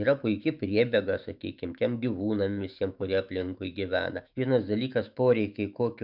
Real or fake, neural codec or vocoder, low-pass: fake; autoencoder, 48 kHz, 128 numbers a frame, DAC-VAE, trained on Japanese speech; 5.4 kHz